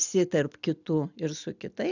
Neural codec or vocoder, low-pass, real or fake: none; 7.2 kHz; real